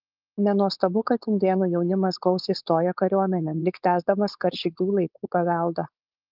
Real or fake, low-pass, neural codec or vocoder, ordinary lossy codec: fake; 5.4 kHz; codec, 16 kHz, 4.8 kbps, FACodec; Opus, 32 kbps